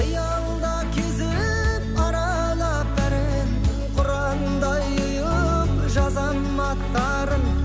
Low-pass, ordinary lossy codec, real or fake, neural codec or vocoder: none; none; real; none